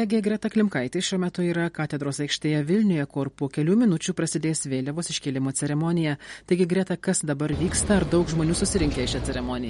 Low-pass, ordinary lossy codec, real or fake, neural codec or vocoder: 19.8 kHz; MP3, 48 kbps; real; none